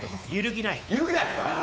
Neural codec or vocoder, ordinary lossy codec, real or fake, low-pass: codec, 16 kHz, 4 kbps, X-Codec, WavLM features, trained on Multilingual LibriSpeech; none; fake; none